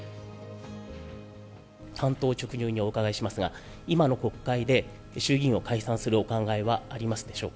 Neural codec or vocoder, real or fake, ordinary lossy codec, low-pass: none; real; none; none